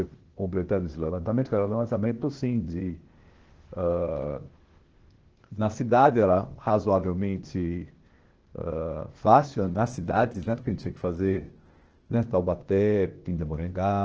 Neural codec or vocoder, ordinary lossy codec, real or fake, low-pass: codec, 16 kHz, 0.8 kbps, ZipCodec; Opus, 16 kbps; fake; 7.2 kHz